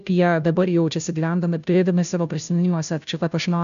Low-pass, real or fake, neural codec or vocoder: 7.2 kHz; fake; codec, 16 kHz, 0.5 kbps, FunCodec, trained on Chinese and English, 25 frames a second